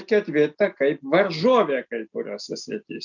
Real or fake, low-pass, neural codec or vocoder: real; 7.2 kHz; none